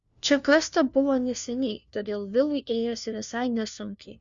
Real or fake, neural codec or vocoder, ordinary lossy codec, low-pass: fake; codec, 16 kHz, 1 kbps, FunCodec, trained on LibriTTS, 50 frames a second; Opus, 64 kbps; 7.2 kHz